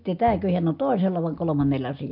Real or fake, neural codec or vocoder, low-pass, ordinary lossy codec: real; none; 5.4 kHz; MP3, 48 kbps